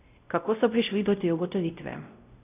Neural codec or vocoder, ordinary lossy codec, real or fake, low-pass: codec, 16 kHz, 0.5 kbps, X-Codec, WavLM features, trained on Multilingual LibriSpeech; none; fake; 3.6 kHz